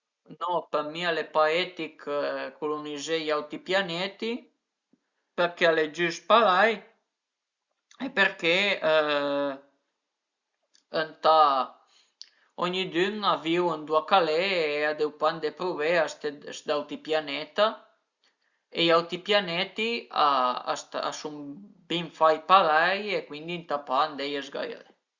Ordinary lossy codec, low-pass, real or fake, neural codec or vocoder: Opus, 64 kbps; 7.2 kHz; real; none